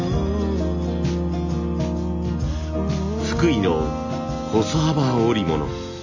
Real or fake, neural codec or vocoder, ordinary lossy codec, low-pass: real; none; none; 7.2 kHz